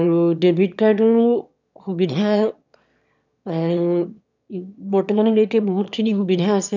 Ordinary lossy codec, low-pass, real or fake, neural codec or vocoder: none; 7.2 kHz; fake; autoencoder, 22.05 kHz, a latent of 192 numbers a frame, VITS, trained on one speaker